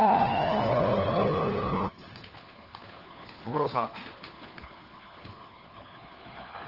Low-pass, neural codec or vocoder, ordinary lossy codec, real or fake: 5.4 kHz; codec, 16 kHz, 4 kbps, FunCodec, trained on LibriTTS, 50 frames a second; Opus, 16 kbps; fake